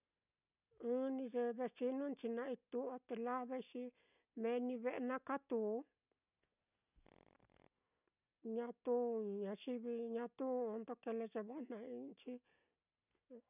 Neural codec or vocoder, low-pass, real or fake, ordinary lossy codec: none; 3.6 kHz; real; none